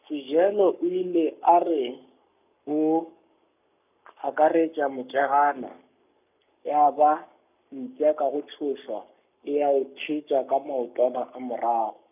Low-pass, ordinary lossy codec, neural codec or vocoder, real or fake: 3.6 kHz; none; codec, 44.1 kHz, 7.8 kbps, Pupu-Codec; fake